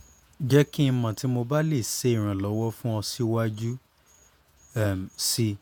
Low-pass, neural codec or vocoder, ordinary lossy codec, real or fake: none; vocoder, 48 kHz, 128 mel bands, Vocos; none; fake